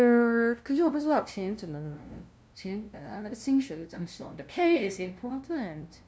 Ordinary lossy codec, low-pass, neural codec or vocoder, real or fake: none; none; codec, 16 kHz, 0.5 kbps, FunCodec, trained on LibriTTS, 25 frames a second; fake